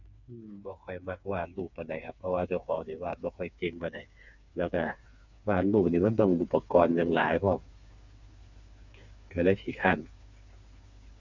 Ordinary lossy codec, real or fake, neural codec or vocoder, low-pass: none; fake; codec, 16 kHz, 4 kbps, FreqCodec, smaller model; 7.2 kHz